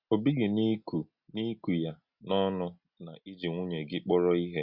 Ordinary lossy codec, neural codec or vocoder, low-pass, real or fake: Opus, 64 kbps; none; 5.4 kHz; real